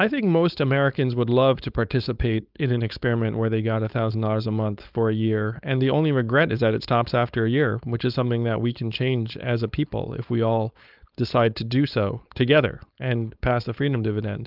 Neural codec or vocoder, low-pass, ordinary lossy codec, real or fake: codec, 16 kHz, 4.8 kbps, FACodec; 5.4 kHz; Opus, 24 kbps; fake